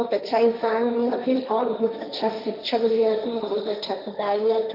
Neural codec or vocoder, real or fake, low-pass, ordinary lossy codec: codec, 16 kHz, 1.1 kbps, Voila-Tokenizer; fake; 5.4 kHz; none